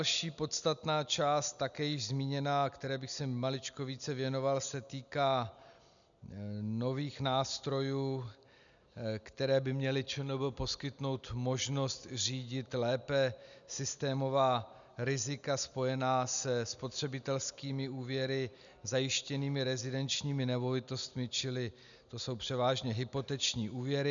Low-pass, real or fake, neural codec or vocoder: 7.2 kHz; real; none